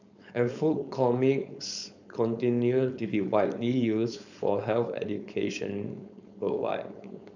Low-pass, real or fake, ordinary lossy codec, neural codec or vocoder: 7.2 kHz; fake; none; codec, 16 kHz, 4.8 kbps, FACodec